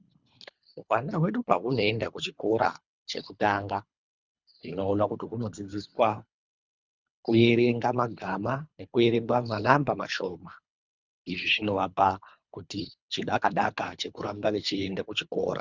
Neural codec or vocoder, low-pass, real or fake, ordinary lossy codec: codec, 24 kHz, 3 kbps, HILCodec; 7.2 kHz; fake; Opus, 64 kbps